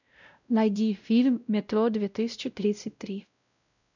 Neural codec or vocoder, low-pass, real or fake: codec, 16 kHz, 0.5 kbps, X-Codec, WavLM features, trained on Multilingual LibriSpeech; 7.2 kHz; fake